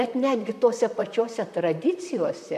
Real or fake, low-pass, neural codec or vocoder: fake; 14.4 kHz; vocoder, 44.1 kHz, 128 mel bands, Pupu-Vocoder